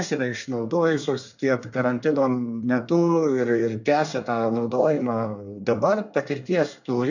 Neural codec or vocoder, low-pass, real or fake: codec, 24 kHz, 1 kbps, SNAC; 7.2 kHz; fake